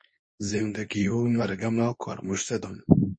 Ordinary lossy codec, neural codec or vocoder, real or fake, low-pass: MP3, 32 kbps; codec, 24 kHz, 0.9 kbps, WavTokenizer, medium speech release version 2; fake; 9.9 kHz